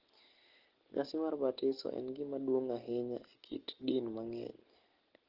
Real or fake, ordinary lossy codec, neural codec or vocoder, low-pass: real; Opus, 16 kbps; none; 5.4 kHz